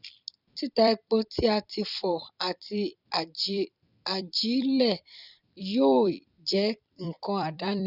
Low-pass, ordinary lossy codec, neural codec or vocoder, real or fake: 5.4 kHz; none; vocoder, 44.1 kHz, 128 mel bands every 256 samples, BigVGAN v2; fake